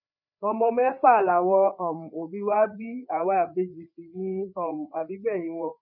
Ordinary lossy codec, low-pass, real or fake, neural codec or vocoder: none; 5.4 kHz; fake; codec, 16 kHz, 4 kbps, FreqCodec, larger model